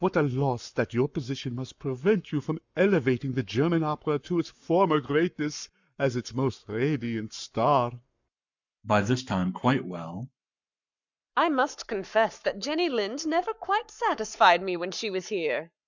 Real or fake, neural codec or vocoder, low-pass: fake; codec, 44.1 kHz, 7.8 kbps, Pupu-Codec; 7.2 kHz